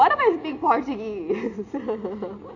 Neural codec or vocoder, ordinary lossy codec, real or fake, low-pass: none; none; real; 7.2 kHz